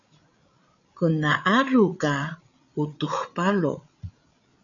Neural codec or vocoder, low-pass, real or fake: codec, 16 kHz, 8 kbps, FreqCodec, larger model; 7.2 kHz; fake